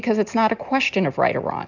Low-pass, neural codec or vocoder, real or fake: 7.2 kHz; none; real